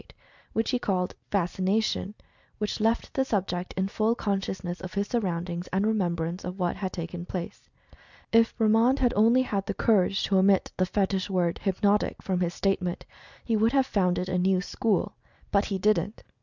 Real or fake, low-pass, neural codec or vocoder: real; 7.2 kHz; none